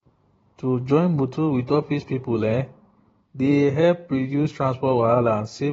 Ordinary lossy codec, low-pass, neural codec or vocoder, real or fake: AAC, 24 kbps; 19.8 kHz; vocoder, 44.1 kHz, 128 mel bands every 512 samples, BigVGAN v2; fake